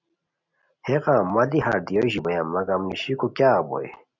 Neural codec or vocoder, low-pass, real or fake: none; 7.2 kHz; real